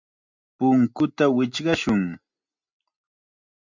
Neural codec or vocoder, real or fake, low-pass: none; real; 7.2 kHz